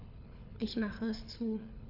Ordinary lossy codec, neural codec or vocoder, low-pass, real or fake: none; codec, 24 kHz, 6 kbps, HILCodec; 5.4 kHz; fake